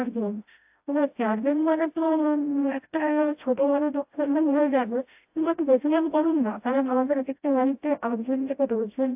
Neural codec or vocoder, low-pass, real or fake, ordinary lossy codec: codec, 16 kHz, 0.5 kbps, FreqCodec, smaller model; 3.6 kHz; fake; AAC, 32 kbps